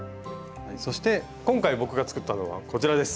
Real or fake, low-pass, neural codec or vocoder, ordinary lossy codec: real; none; none; none